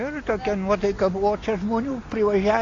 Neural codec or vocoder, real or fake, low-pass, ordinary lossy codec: none; real; 7.2 kHz; AAC, 32 kbps